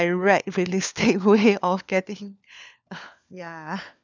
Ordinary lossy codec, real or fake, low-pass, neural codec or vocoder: none; fake; none; codec, 16 kHz, 4 kbps, FunCodec, trained on LibriTTS, 50 frames a second